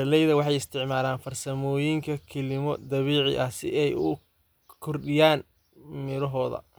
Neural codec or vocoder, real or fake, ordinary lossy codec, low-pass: none; real; none; none